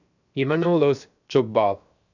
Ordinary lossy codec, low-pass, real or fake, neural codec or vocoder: none; 7.2 kHz; fake; codec, 16 kHz, 0.7 kbps, FocalCodec